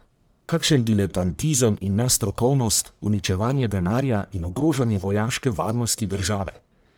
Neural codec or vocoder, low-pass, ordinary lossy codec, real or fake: codec, 44.1 kHz, 1.7 kbps, Pupu-Codec; none; none; fake